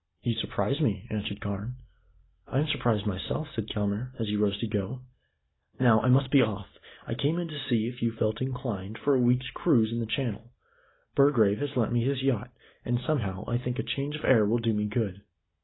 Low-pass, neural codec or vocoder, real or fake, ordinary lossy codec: 7.2 kHz; none; real; AAC, 16 kbps